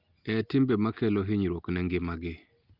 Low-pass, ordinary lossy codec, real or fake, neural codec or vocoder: 5.4 kHz; Opus, 32 kbps; real; none